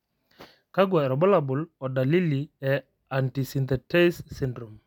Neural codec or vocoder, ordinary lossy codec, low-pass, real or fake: none; none; 19.8 kHz; real